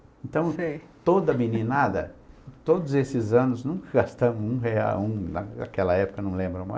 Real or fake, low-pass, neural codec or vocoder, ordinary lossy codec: real; none; none; none